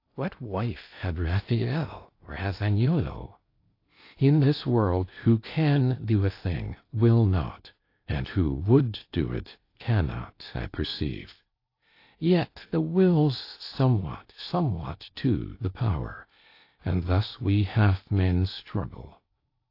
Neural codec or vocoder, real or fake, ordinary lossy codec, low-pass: codec, 16 kHz in and 24 kHz out, 0.6 kbps, FocalCodec, streaming, 2048 codes; fake; AAC, 32 kbps; 5.4 kHz